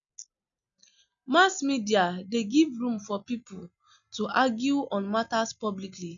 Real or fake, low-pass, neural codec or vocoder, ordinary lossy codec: real; 7.2 kHz; none; none